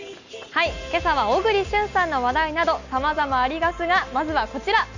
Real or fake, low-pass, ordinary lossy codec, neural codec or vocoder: real; 7.2 kHz; none; none